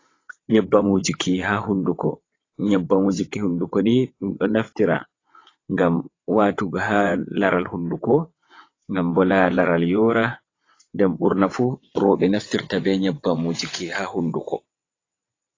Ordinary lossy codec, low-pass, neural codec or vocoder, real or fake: AAC, 32 kbps; 7.2 kHz; vocoder, 22.05 kHz, 80 mel bands, WaveNeXt; fake